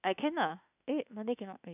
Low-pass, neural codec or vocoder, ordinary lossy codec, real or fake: 3.6 kHz; autoencoder, 48 kHz, 32 numbers a frame, DAC-VAE, trained on Japanese speech; none; fake